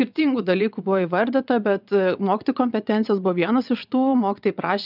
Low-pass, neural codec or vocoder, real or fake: 5.4 kHz; vocoder, 22.05 kHz, 80 mel bands, WaveNeXt; fake